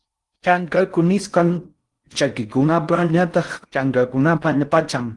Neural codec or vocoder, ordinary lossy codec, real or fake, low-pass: codec, 16 kHz in and 24 kHz out, 0.6 kbps, FocalCodec, streaming, 4096 codes; Opus, 24 kbps; fake; 10.8 kHz